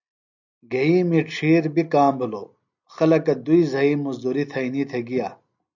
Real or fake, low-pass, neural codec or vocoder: real; 7.2 kHz; none